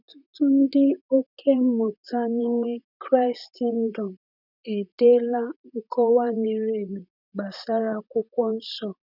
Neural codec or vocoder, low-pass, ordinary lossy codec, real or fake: vocoder, 44.1 kHz, 128 mel bands, Pupu-Vocoder; 5.4 kHz; none; fake